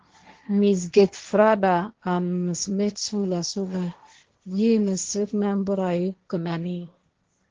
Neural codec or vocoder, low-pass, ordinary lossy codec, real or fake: codec, 16 kHz, 1.1 kbps, Voila-Tokenizer; 7.2 kHz; Opus, 16 kbps; fake